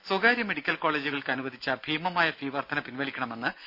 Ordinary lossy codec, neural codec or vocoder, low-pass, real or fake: none; none; 5.4 kHz; real